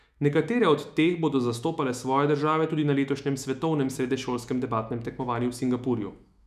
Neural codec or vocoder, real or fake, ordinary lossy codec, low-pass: autoencoder, 48 kHz, 128 numbers a frame, DAC-VAE, trained on Japanese speech; fake; none; 14.4 kHz